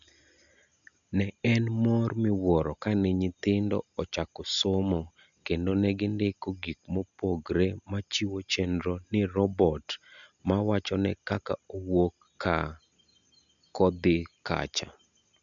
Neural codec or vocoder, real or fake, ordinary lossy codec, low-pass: none; real; none; 7.2 kHz